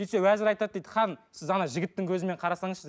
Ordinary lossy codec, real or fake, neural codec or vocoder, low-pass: none; real; none; none